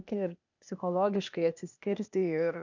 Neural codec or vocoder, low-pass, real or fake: codec, 16 kHz, 0.8 kbps, ZipCodec; 7.2 kHz; fake